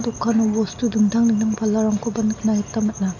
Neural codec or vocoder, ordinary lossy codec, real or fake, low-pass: none; none; real; 7.2 kHz